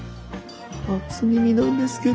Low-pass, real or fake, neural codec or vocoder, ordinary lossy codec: none; real; none; none